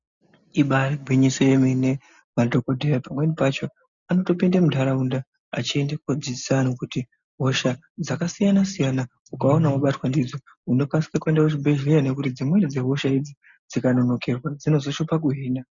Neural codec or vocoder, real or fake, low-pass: none; real; 7.2 kHz